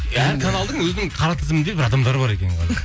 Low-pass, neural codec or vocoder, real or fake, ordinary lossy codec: none; none; real; none